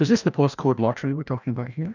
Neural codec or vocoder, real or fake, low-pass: codec, 16 kHz, 1 kbps, FreqCodec, larger model; fake; 7.2 kHz